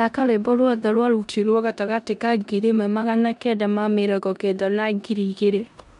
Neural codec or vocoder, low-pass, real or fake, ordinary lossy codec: codec, 16 kHz in and 24 kHz out, 0.9 kbps, LongCat-Audio-Codec, four codebook decoder; 10.8 kHz; fake; none